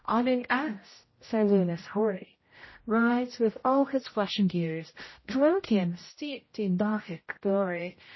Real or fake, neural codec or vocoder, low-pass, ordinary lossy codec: fake; codec, 16 kHz, 0.5 kbps, X-Codec, HuBERT features, trained on general audio; 7.2 kHz; MP3, 24 kbps